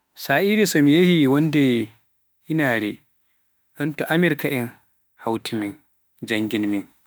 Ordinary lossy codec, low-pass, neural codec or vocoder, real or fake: none; none; autoencoder, 48 kHz, 32 numbers a frame, DAC-VAE, trained on Japanese speech; fake